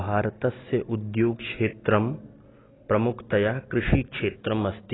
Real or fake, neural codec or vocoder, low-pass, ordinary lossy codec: real; none; 7.2 kHz; AAC, 16 kbps